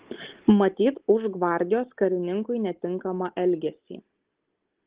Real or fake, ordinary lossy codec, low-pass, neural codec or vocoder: real; Opus, 16 kbps; 3.6 kHz; none